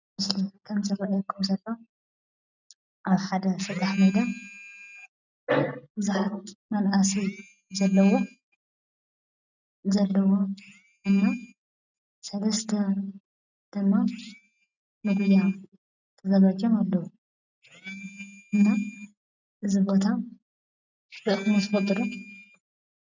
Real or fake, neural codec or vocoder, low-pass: real; none; 7.2 kHz